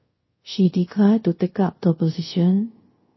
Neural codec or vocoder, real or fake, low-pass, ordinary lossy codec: codec, 24 kHz, 0.5 kbps, DualCodec; fake; 7.2 kHz; MP3, 24 kbps